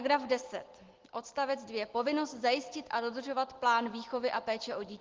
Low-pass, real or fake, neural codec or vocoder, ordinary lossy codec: 7.2 kHz; real; none; Opus, 16 kbps